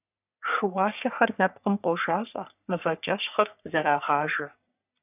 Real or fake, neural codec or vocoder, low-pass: fake; codec, 44.1 kHz, 3.4 kbps, Pupu-Codec; 3.6 kHz